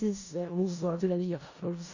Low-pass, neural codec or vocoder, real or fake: 7.2 kHz; codec, 16 kHz in and 24 kHz out, 0.4 kbps, LongCat-Audio-Codec, four codebook decoder; fake